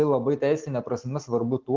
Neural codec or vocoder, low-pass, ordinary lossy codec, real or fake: none; 7.2 kHz; Opus, 16 kbps; real